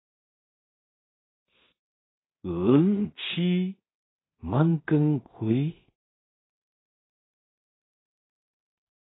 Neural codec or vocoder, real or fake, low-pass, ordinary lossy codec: codec, 16 kHz in and 24 kHz out, 0.4 kbps, LongCat-Audio-Codec, two codebook decoder; fake; 7.2 kHz; AAC, 16 kbps